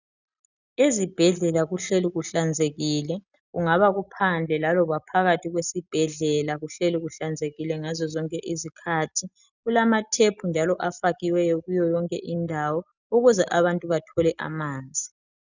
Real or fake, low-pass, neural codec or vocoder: real; 7.2 kHz; none